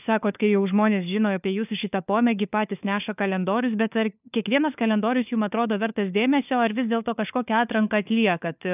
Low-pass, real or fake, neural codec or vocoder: 3.6 kHz; fake; codec, 16 kHz, 4 kbps, FunCodec, trained on LibriTTS, 50 frames a second